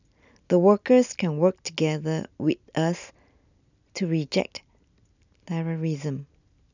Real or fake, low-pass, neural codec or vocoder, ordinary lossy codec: real; 7.2 kHz; none; none